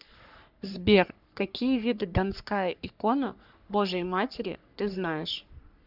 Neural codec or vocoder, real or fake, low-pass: codec, 44.1 kHz, 3.4 kbps, Pupu-Codec; fake; 5.4 kHz